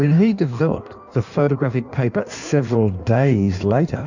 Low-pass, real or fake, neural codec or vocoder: 7.2 kHz; fake; codec, 16 kHz in and 24 kHz out, 1.1 kbps, FireRedTTS-2 codec